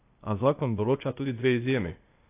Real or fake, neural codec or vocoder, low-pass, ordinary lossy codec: fake; codec, 16 kHz, 0.8 kbps, ZipCodec; 3.6 kHz; AAC, 24 kbps